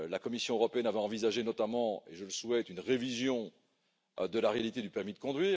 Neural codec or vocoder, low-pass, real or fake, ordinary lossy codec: none; none; real; none